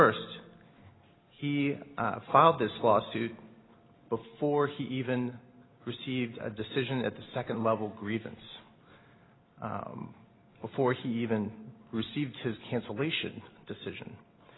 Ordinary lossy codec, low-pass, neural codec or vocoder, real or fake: AAC, 16 kbps; 7.2 kHz; none; real